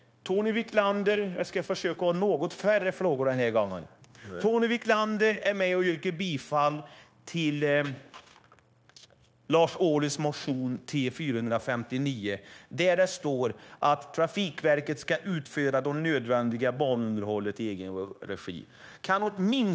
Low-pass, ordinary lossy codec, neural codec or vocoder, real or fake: none; none; codec, 16 kHz, 0.9 kbps, LongCat-Audio-Codec; fake